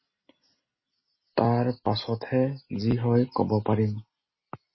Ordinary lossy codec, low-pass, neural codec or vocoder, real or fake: MP3, 24 kbps; 7.2 kHz; none; real